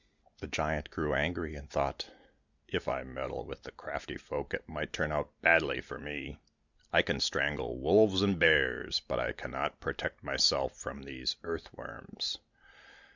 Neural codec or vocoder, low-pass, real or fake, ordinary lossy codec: none; 7.2 kHz; real; Opus, 64 kbps